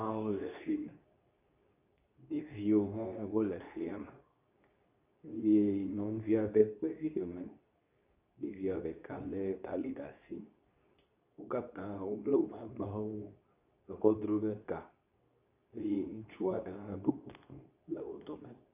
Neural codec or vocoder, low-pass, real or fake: codec, 24 kHz, 0.9 kbps, WavTokenizer, medium speech release version 2; 3.6 kHz; fake